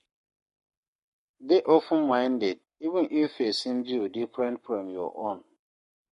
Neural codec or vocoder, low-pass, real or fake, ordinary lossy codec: codec, 44.1 kHz, 7.8 kbps, Pupu-Codec; 14.4 kHz; fake; MP3, 48 kbps